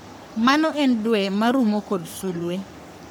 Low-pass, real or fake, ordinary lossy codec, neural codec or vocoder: none; fake; none; codec, 44.1 kHz, 3.4 kbps, Pupu-Codec